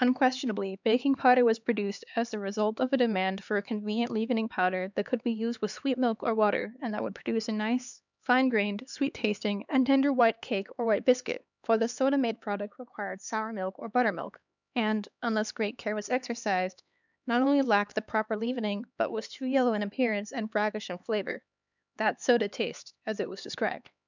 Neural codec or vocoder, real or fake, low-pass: codec, 16 kHz, 4 kbps, X-Codec, HuBERT features, trained on LibriSpeech; fake; 7.2 kHz